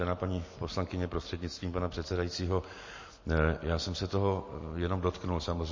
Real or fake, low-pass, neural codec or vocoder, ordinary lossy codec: real; 7.2 kHz; none; MP3, 32 kbps